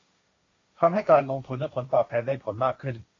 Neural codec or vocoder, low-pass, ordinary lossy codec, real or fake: codec, 16 kHz, 1.1 kbps, Voila-Tokenizer; 7.2 kHz; AAC, 32 kbps; fake